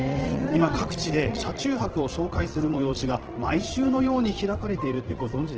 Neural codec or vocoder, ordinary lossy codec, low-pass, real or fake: vocoder, 22.05 kHz, 80 mel bands, Vocos; Opus, 16 kbps; 7.2 kHz; fake